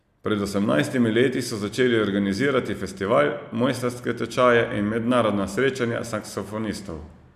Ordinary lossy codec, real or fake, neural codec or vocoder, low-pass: none; real; none; 14.4 kHz